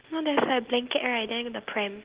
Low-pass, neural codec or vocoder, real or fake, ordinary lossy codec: 3.6 kHz; none; real; Opus, 16 kbps